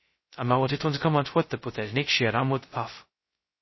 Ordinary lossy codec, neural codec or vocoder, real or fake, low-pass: MP3, 24 kbps; codec, 16 kHz, 0.2 kbps, FocalCodec; fake; 7.2 kHz